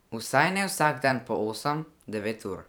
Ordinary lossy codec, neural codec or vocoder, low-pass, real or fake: none; vocoder, 44.1 kHz, 128 mel bands every 512 samples, BigVGAN v2; none; fake